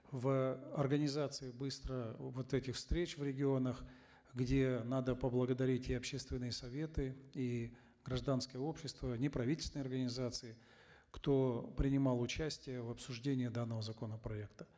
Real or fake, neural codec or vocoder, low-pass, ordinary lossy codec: real; none; none; none